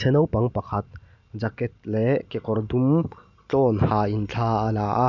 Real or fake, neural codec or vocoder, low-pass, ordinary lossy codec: real; none; 7.2 kHz; none